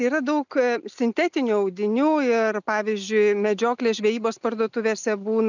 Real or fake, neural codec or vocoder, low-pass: real; none; 7.2 kHz